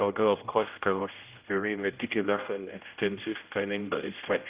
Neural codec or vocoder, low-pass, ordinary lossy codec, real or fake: codec, 16 kHz, 0.5 kbps, X-Codec, HuBERT features, trained on general audio; 3.6 kHz; Opus, 32 kbps; fake